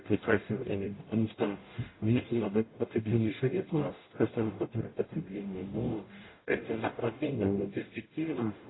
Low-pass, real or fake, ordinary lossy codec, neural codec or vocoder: 7.2 kHz; fake; AAC, 16 kbps; codec, 44.1 kHz, 0.9 kbps, DAC